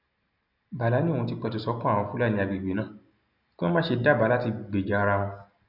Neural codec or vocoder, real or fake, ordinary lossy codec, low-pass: none; real; none; 5.4 kHz